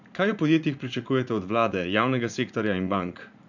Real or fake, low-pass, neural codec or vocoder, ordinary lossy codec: fake; 7.2 kHz; vocoder, 44.1 kHz, 80 mel bands, Vocos; none